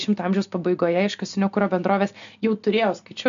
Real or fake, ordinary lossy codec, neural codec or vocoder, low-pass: real; MP3, 96 kbps; none; 7.2 kHz